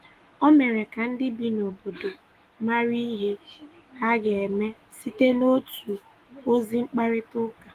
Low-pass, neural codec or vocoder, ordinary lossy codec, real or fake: 14.4 kHz; autoencoder, 48 kHz, 128 numbers a frame, DAC-VAE, trained on Japanese speech; Opus, 24 kbps; fake